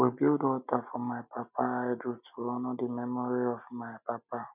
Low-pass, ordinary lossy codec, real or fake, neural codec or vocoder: 3.6 kHz; none; real; none